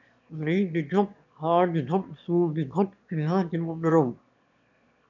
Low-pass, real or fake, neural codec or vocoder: 7.2 kHz; fake; autoencoder, 22.05 kHz, a latent of 192 numbers a frame, VITS, trained on one speaker